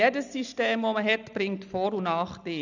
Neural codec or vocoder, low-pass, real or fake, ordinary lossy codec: none; 7.2 kHz; real; none